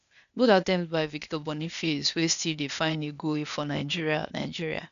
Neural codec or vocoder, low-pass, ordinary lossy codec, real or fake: codec, 16 kHz, 0.8 kbps, ZipCodec; 7.2 kHz; none; fake